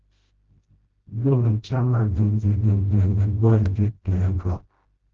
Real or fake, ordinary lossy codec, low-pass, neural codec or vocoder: fake; Opus, 16 kbps; 7.2 kHz; codec, 16 kHz, 0.5 kbps, FreqCodec, smaller model